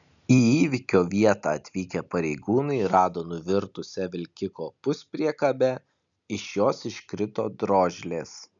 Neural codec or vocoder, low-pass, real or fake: none; 7.2 kHz; real